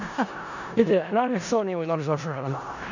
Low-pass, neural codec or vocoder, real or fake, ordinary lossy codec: 7.2 kHz; codec, 16 kHz in and 24 kHz out, 0.4 kbps, LongCat-Audio-Codec, four codebook decoder; fake; none